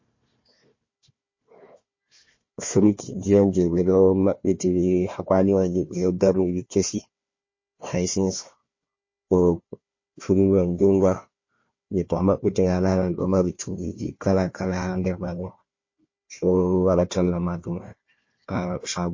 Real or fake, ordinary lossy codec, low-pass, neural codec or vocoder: fake; MP3, 32 kbps; 7.2 kHz; codec, 16 kHz, 1 kbps, FunCodec, trained on Chinese and English, 50 frames a second